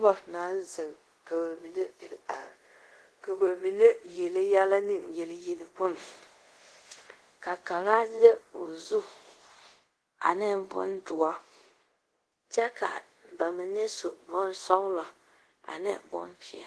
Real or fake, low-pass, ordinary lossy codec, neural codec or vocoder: fake; 10.8 kHz; Opus, 16 kbps; codec, 24 kHz, 0.5 kbps, DualCodec